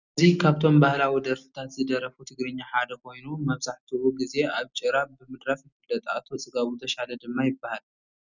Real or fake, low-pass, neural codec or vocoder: real; 7.2 kHz; none